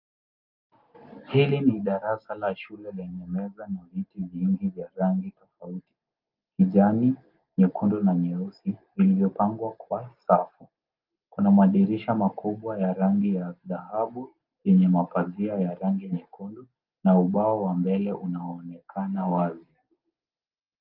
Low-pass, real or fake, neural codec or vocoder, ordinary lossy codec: 5.4 kHz; real; none; Opus, 32 kbps